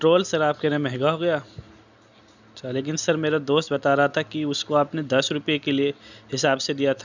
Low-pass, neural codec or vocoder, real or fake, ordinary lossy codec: 7.2 kHz; none; real; none